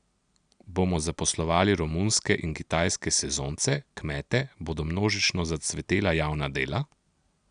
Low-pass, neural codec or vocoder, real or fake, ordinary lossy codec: 9.9 kHz; none; real; none